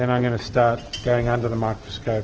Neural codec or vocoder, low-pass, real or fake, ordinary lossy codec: none; 7.2 kHz; real; Opus, 16 kbps